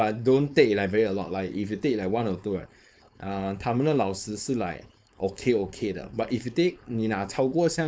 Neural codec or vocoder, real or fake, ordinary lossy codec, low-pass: codec, 16 kHz, 4.8 kbps, FACodec; fake; none; none